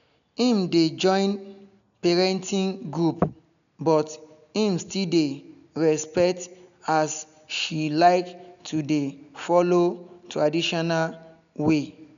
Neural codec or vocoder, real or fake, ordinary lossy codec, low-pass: none; real; none; 7.2 kHz